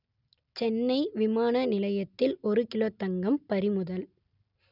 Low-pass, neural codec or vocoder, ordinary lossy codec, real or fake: 5.4 kHz; none; none; real